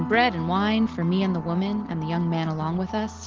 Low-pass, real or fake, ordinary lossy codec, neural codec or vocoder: 7.2 kHz; real; Opus, 16 kbps; none